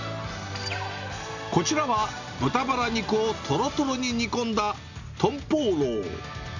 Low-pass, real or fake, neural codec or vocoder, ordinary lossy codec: 7.2 kHz; real; none; none